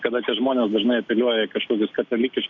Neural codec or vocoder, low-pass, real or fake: none; 7.2 kHz; real